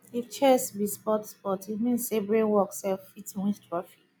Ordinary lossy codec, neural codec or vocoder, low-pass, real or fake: none; none; none; real